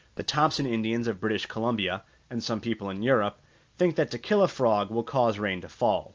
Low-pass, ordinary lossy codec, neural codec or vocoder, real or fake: 7.2 kHz; Opus, 24 kbps; none; real